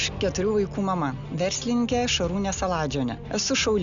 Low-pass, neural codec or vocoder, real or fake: 7.2 kHz; none; real